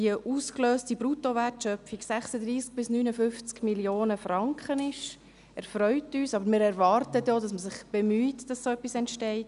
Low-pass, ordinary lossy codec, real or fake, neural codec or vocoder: 10.8 kHz; none; real; none